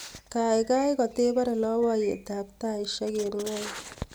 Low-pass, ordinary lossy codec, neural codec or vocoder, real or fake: none; none; none; real